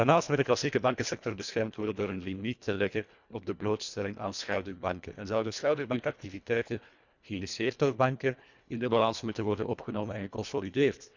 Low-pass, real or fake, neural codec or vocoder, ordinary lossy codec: 7.2 kHz; fake; codec, 24 kHz, 1.5 kbps, HILCodec; none